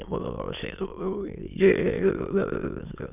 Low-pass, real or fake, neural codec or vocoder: 3.6 kHz; fake; autoencoder, 22.05 kHz, a latent of 192 numbers a frame, VITS, trained on many speakers